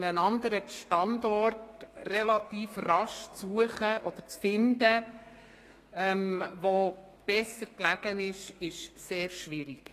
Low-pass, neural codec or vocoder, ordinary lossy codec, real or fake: 14.4 kHz; codec, 32 kHz, 1.9 kbps, SNAC; AAC, 48 kbps; fake